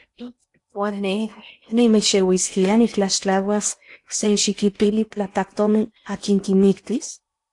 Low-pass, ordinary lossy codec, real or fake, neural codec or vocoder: 10.8 kHz; AAC, 64 kbps; fake; codec, 16 kHz in and 24 kHz out, 0.8 kbps, FocalCodec, streaming, 65536 codes